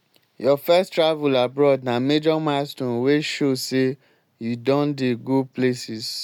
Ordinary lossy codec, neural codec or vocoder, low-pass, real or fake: none; none; 19.8 kHz; real